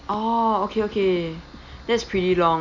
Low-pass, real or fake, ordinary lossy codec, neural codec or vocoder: 7.2 kHz; real; none; none